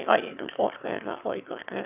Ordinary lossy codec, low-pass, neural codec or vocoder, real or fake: none; 3.6 kHz; autoencoder, 22.05 kHz, a latent of 192 numbers a frame, VITS, trained on one speaker; fake